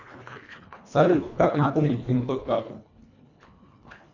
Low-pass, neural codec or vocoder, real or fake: 7.2 kHz; codec, 24 kHz, 1.5 kbps, HILCodec; fake